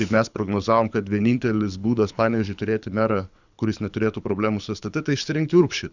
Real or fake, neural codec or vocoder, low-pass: fake; codec, 24 kHz, 6 kbps, HILCodec; 7.2 kHz